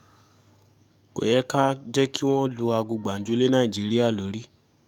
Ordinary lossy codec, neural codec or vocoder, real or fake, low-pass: none; codec, 44.1 kHz, 7.8 kbps, DAC; fake; 19.8 kHz